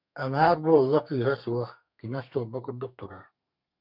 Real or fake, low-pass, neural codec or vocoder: fake; 5.4 kHz; codec, 32 kHz, 1.9 kbps, SNAC